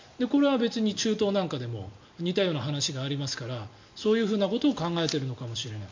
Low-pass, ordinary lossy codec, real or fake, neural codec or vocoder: 7.2 kHz; MP3, 48 kbps; real; none